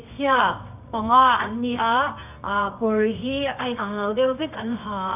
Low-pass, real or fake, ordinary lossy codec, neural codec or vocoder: 3.6 kHz; fake; none; codec, 24 kHz, 0.9 kbps, WavTokenizer, medium music audio release